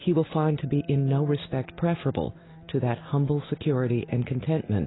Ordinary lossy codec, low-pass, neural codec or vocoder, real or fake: AAC, 16 kbps; 7.2 kHz; none; real